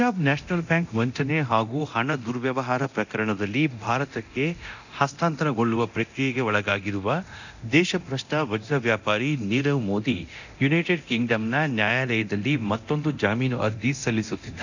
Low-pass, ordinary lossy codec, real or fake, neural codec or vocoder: 7.2 kHz; none; fake; codec, 24 kHz, 0.9 kbps, DualCodec